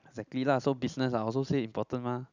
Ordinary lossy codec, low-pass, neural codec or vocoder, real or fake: none; 7.2 kHz; none; real